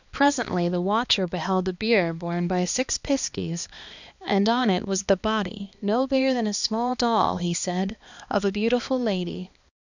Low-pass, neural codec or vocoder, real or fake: 7.2 kHz; codec, 16 kHz, 2 kbps, X-Codec, HuBERT features, trained on balanced general audio; fake